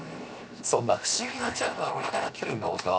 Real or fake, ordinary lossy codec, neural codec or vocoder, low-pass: fake; none; codec, 16 kHz, 0.7 kbps, FocalCodec; none